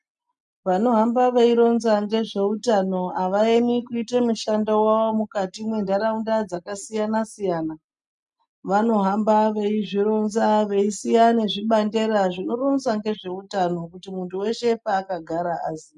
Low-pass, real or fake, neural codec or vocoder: 10.8 kHz; real; none